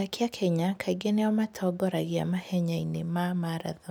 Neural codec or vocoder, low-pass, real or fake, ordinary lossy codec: none; none; real; none